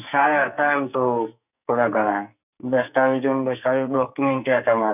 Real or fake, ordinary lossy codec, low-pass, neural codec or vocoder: fake; none; 3.6 kHz; codec, 44.1 kHz, 2.6 kbps, SNAC